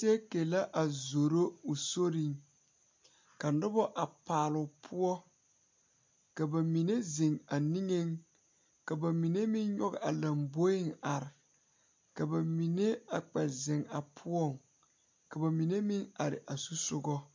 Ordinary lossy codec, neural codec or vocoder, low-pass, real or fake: MP3, 48 kbps; none; 7.2 kHz; real